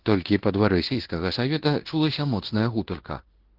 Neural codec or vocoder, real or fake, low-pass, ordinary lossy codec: codec, 16 kHz in and 24 kHz out, 0.9 kbps, LongCat-Audio-Codec, fine tuned four codebook decoder; fake; 5.4 kHz; Opus, 16 kbps